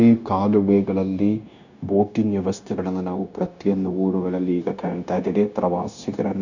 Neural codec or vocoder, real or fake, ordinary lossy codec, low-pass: codec, 16 kHz, 0.9 kbps, LongCat-Audio-Codec; fake; none; 7.2 kHz